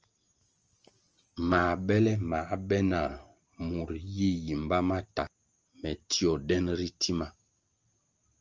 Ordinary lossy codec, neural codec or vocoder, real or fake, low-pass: Opus, 24 kbps; none; real; 7.2 kHz